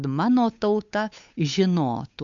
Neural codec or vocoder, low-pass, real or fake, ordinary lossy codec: codec, 16 kHz, 8 kbps, FunCodec, trained on Chinese and English, 25 frames a second; 7.2 kHz; fake; AAC, 64 kbps